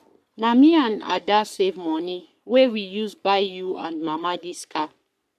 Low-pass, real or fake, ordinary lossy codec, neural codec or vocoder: 14.4 kHz; fake; none; codec, 44.1 kHz, 3.4 kbps, Pupu-Codec